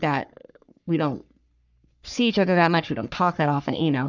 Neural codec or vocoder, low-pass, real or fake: codec, 44.1 kHz, 3.4 kbps, Pupu-Codec; 7.2 kHz; fake